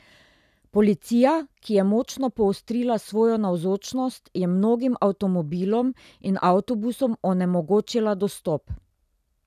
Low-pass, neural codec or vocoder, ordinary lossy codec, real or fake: 14.4 kHz; none; none; real